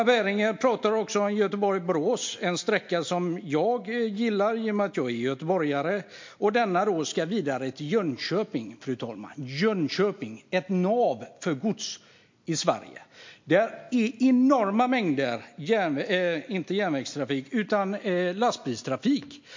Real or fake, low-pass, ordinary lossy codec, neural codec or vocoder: real; 7.2 kHz; MP3, 48 kbps; none